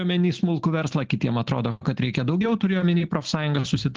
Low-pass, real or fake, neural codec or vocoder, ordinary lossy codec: 7.2 kHz; real; none; Opus, 16 kbps